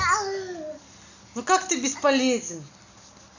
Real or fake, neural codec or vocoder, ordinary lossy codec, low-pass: real; none; none; 7.2 kHz